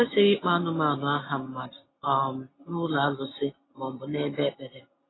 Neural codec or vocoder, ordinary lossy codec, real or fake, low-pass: none; AAC, 16 kbps; real; 7.2 kHz